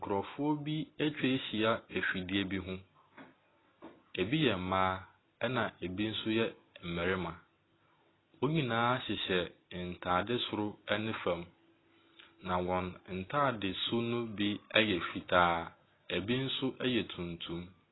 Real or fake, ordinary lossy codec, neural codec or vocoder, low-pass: real; AAC, 16 kbps; none; 7.2 kHz